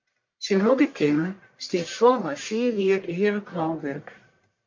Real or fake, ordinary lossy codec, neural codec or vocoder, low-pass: fake; MP3, 48 kbps; codec, 44.1 kHz, 1.7 kbps, Pupu-Codec; 7.2 kHz